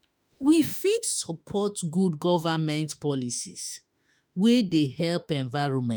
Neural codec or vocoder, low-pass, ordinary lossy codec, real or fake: autoencoder, 48 kHz, 32 numbers a frame, DAC-VAE, trained on Japanese speech; none; none; fake